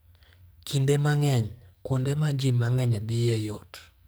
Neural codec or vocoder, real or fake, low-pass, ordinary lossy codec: codec, 44.1 kHz, 2.6 kbps, SNAC; fake; none; none